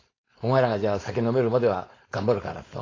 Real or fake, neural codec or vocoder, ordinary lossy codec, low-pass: fake; codec, 16 kHz, 4.8 kbps, FACodec; AAC, 32 kbps; 7.2 kHz